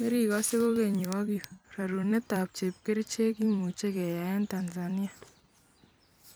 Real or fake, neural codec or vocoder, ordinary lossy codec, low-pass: fake; vocoder, 44.1 kHz, 128 mel bands every 256 samples, BigVGAN v2; none; none